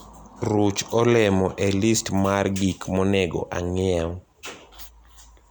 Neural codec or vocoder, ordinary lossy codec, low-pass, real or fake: none; none; none; real